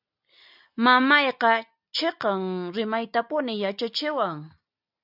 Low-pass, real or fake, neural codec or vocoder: 5.4 kHz; real; none